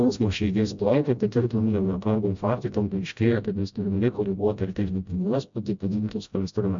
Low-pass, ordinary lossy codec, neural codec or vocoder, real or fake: 7.2 kHz; MP3, 64 kbps; codec, 16 kHz, 0.5 kbps, FreqCodec, smaller model; fake